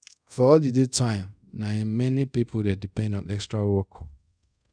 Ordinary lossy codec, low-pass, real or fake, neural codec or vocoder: none; 9.9 kHz; fake; codec, 24 kHz, 0.5 kbps, DualCodec